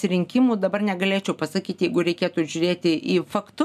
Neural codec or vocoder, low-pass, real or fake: none; 14.4 kHz; real